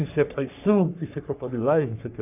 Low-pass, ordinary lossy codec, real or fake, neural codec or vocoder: 3.6 kHz; none; fake; codec, 44.1 kHz, 3.4 kbps, Pupu-Codec